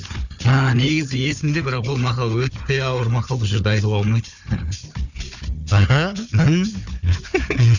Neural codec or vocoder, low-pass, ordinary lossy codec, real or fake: codec, 16 kHz, 4 kbps, FunCodec, trained on Chinese and English, 50 frames a second; 7.2 kHz; none; fake